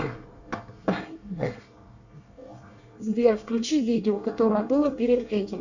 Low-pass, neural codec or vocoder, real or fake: 7.2 kHz; codec, 24 kHz, 1 kbps, SNAC; fake